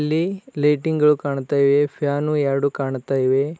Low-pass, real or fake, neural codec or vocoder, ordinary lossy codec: none; real; none; none